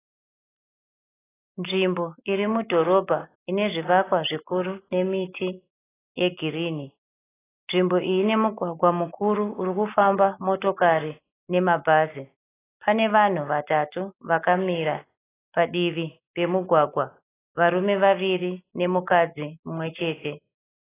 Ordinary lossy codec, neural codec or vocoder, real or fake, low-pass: AAC, 16 kbps; none; real; 3.6 kHz